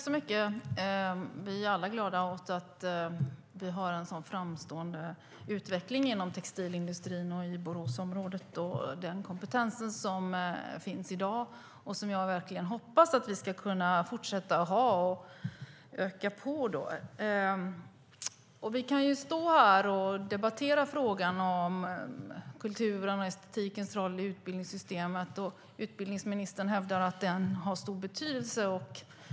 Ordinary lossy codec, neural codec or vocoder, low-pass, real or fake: none; none; none; real